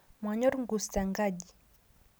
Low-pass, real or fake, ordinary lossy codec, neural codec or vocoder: none; real; none; none